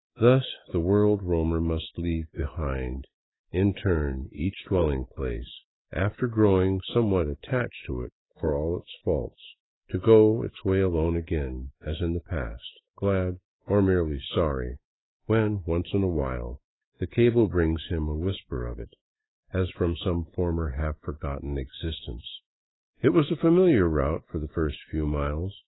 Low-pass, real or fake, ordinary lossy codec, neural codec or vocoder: 7.2 kHz; fake; AAC, 16 kbps; autoencoder, 48 kHz, 128 numbers a frame, DAC-VAE, trained on Japanese speech